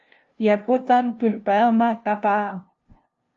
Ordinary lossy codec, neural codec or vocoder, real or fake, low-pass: Opus, 32 kbps; codec, 16 kHz, 0.5 kbps, FunCodec, trained on LibriTTS, 25 frames a second; fake; 7.2 kHz